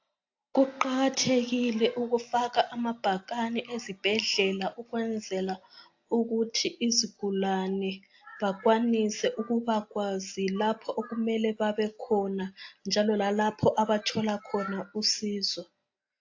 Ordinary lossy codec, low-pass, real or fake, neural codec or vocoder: AAC, 48 kbps; 7.2 kHz; real; none